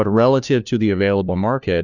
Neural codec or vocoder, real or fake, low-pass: codec, 16 kHz, 1 kbps, FunCodec, trained on LibriTTS, 50 frames a second; fake; 7.2 kHz